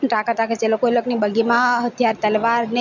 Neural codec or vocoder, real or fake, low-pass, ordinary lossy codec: none; real; 7.2 kHz; none